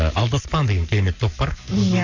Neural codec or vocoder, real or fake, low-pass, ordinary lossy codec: codec, 44.1 kHz, 7.8 kbps, Pupu-Codec; fake; 7.2 kHz; none